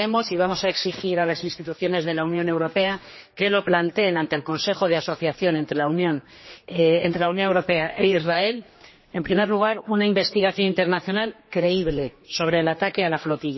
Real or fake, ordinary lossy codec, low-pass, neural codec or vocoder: fake; MP3, 24 kbps; 7.2 kHz; codec, 16 kHz, 2 kbps, X-Codec, HuBERT features, trained on general audio